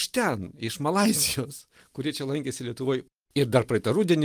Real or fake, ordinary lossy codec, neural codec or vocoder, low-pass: real; Opus, 32 kbps; none; 14.4 kHz